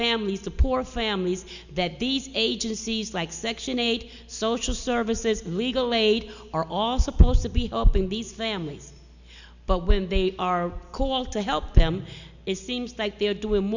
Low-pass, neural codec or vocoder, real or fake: 7.2 kHz; none; real